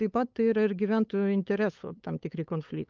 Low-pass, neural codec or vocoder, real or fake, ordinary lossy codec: 7.2 kHz; codec, 16 kHz, 8 kbps, FunCodec, trained on LibriTTS, 25 frames a second; fake; Opus, 24 kbps